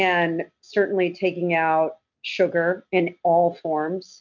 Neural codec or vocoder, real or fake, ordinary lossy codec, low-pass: none; real; MP3, 64 kbps; 7.2 kHz